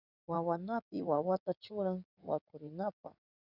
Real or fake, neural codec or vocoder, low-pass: fake; codec, 16 kHz in and 24 kHz out, 2.2 kbps, FireRedTTS-2 codec; 5.4 kHz